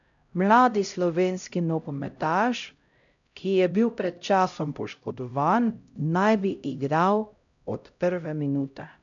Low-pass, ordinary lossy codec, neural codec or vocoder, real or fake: 7.2 kHz; none; codec, 16 kHz, 0.5 kbps, X-Codec, HuBERT features, trained on LibriSpeech; fake